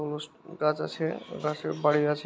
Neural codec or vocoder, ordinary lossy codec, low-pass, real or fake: none; none; none; real